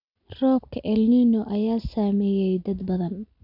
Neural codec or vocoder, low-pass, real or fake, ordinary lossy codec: none; 5.4 kHz; real; none